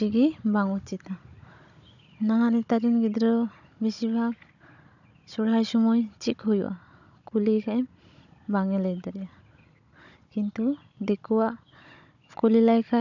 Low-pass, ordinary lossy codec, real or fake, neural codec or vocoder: 7.2 kHz; none; fake; codec, 16 kHz, 16 kbps, FunCodec, trained on Chinese and English, 50 frames a second